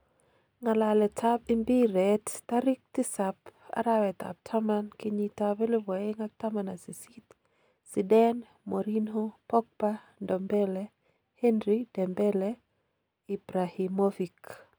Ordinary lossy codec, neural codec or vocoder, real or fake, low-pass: none; none; real; none